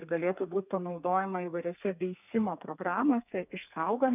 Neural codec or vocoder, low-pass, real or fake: codec, 32 kHz, 1.9 kbps, SNAC; 3.6 kHz; fake